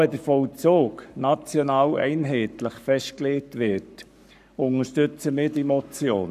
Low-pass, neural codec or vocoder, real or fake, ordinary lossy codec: 14.4 kHz; codec, 44.1 kHz, 7.8 kbps, Pupu-Codec; fake; none